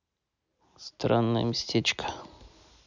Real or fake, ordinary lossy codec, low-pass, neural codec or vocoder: real; none; 7.2 kHz; none